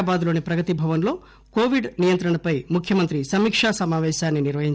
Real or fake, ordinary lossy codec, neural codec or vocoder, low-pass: real; none; none; none